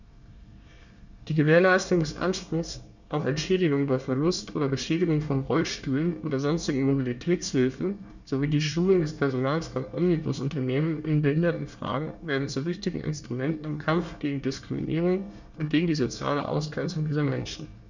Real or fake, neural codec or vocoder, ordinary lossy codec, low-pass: fake; codec, 24 kHz, 1 kbps, SNAC; none; 7.2 kHz